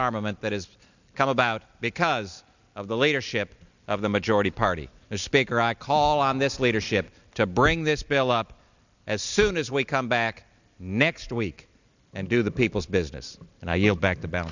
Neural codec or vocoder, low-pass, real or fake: none; 7.2 kHz; real